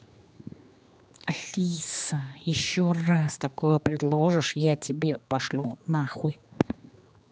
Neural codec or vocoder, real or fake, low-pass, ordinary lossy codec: codec, 16 kHz, 2 kbps, X-Codec, HuBERT features, trained on general audio; fake; none; none